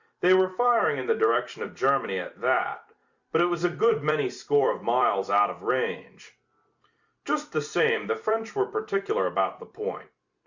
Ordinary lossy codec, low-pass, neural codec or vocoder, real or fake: Opus, 64 kbps; 7.2 kHz; vocoder, 44.1 kHz, 128 mel bands every 256 samples, BigVGAN v2; fake